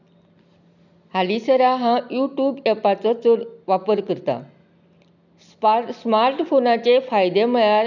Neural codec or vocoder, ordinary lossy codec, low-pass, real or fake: none; none; 7.2 kHz; real